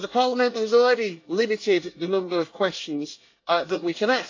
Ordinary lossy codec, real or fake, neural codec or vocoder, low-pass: AAC, 48 kbps; fake; codec, 24 kHz, 1 kbps, SNAC; 7.2 kHz